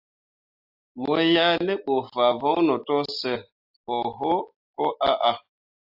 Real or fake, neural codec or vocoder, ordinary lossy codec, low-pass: real; none; AAC, 48 kbps; 5.4 kHz